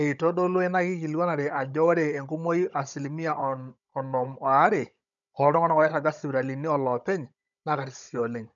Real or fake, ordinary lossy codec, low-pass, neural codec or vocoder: fake; none; 7.2 kHz; codec, 16 kHz, 16 kbps, FunCodec, trained on Chinese and English, 50 frames a second